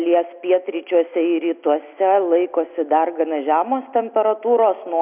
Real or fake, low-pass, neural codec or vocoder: real; 3.6 kHz; none